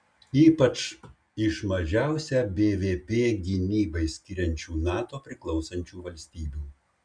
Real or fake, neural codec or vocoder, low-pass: real; none; 9.9 kHz